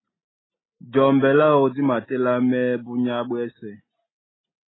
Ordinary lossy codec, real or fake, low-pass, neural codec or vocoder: AAC, 16 kbps; real; 7.2 kHz; none